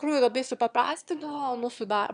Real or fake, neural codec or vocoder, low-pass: fake; autoencoder, 22.05 kHz, a latent of 192 numbers a frame, VITS, trained on one speaker; 9.9 kHz